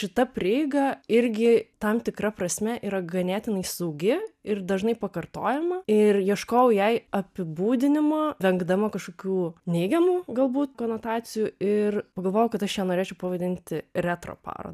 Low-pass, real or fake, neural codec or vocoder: 14.4 kHz; real; none